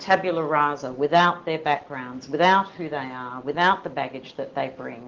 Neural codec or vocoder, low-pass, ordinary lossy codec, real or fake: none; 7.2 kHz; Opus, 16 kbps; real